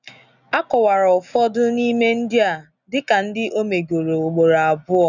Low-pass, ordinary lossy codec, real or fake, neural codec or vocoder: 7.2 kHz; none; real; none